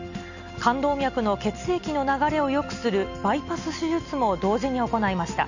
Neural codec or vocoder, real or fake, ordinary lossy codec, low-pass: none; real; none; 7.2 kHz